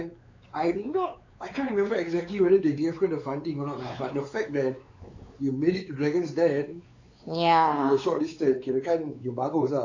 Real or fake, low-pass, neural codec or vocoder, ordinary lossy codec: fake; 7.2 kHz; codec, 16 kHz, 4 kbps, X-Codec, WavLM features, trained on Multilingual LibriSpeech; AAC, 48 kbps